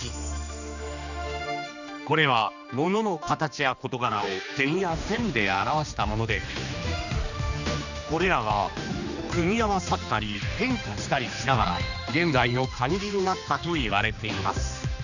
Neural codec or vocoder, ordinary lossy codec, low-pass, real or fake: codec, 16 kHz, 2 kbps, X-Codec, HuBERT features, trained on general audio; none; 7.2 kHz; fake